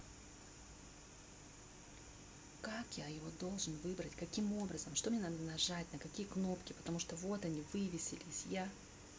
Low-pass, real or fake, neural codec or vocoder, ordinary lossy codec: none; real; none; none